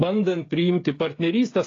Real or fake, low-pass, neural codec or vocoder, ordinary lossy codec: fake; 7.2 kHz; codec, 16 kHz, 16 kbps, FreqCodec, smaller model; AAC, 32 kbps